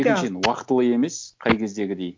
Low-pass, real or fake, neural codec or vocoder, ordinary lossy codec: none; real; none; none